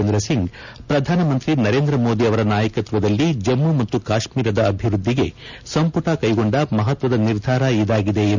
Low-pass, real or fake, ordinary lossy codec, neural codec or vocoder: 7.2 kHz; real; none; none